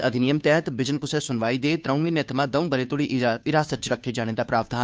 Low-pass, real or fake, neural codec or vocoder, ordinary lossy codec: none; fake; codec, 16 kHz, 2 kbps, FunCodec, trained on Chinese and English, 25 frames a second; none